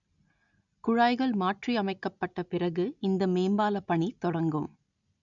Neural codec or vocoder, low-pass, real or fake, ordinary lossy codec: none; 7.2 kHz; real; none